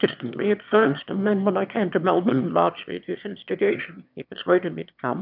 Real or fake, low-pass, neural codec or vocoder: fake; 5.4 kHz; autoencoder, 22.05 kHz, a latent of 192 numbers a frame, VITS, trained on one speaker